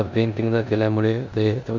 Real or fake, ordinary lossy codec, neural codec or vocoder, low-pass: fake; none; codec, 16 kHz in and 24 kHz out, 0.9 kbps, LongCat-Audio-Codec, four codebook decoder; 7.2 kHz